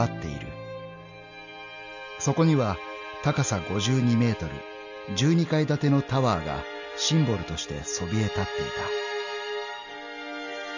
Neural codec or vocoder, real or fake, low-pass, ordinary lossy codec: none; real; 7.2 kHz; none